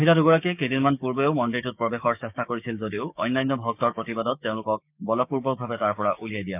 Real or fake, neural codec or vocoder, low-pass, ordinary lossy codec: fake; codec, 16 kHz, 6 kbps, DAC; 3.6 kHz; none